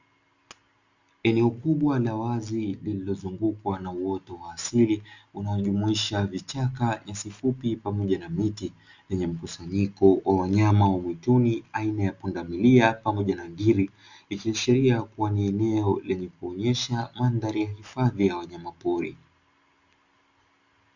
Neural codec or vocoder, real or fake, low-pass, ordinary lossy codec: none; real; 7.2 kHz; Opus, 64 kbps